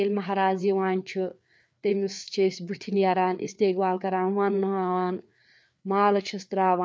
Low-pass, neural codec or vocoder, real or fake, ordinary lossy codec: none; codec, 16 kHz, 4 kbps, FreqCodec, larger model; fake; none